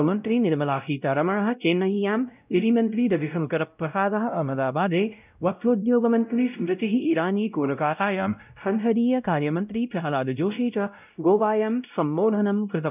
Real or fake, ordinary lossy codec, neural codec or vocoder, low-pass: fake; none; codec, 16 kHz, 0.5 kbps, X-Codec, WavLM features, trained on Multilingual LibriSpeech; 3.6 kHz